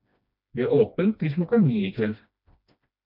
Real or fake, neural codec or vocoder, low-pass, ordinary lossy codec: fake; codec, 16 kHz, 1 kbps, FreqCodec, smaller model; 5.4 kHz; MP3, 48 kbps